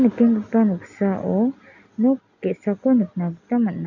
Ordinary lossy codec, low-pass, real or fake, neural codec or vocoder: none; 7.2 kHz; real; none